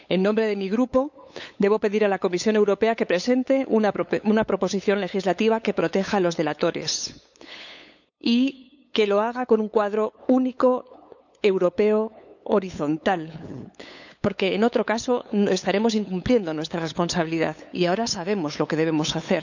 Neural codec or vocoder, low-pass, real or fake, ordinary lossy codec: codec, 16 kHz, 8 kbps, FunCodec, trained on LibriTTS, 25 frames a second; 7.2 kHz; fake; none